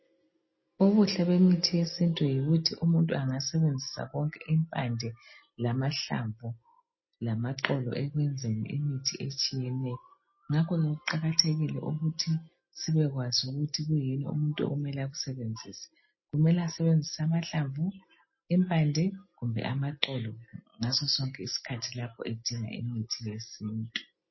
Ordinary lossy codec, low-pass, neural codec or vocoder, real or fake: MP3, 24 kbps; 7.2 kHz; none; real